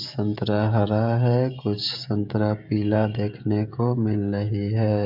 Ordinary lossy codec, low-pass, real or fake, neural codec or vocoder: none; 5.4 kHz; fake; codec, 16 kHz, 16 kbps, FreqCodec, smaller model